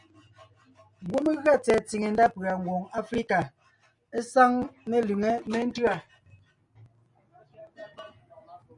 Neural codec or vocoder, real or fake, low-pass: none; real; 10.8 kHz